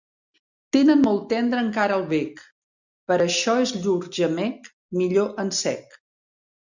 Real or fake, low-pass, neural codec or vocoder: real; 7.2 kHz; none